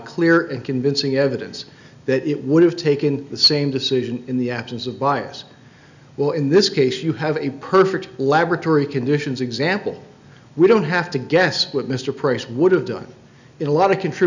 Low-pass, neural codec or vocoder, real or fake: 7.2 kHz; none; real